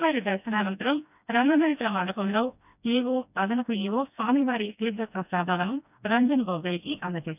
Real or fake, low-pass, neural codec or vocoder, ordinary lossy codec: fake; 3.6 kHz; codec, 16 kHz, 1 kbps, FreqCodec, smaller model; none